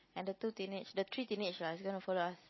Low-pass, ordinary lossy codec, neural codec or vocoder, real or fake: 7.2 kHz; MP3, 24 kbps; none; real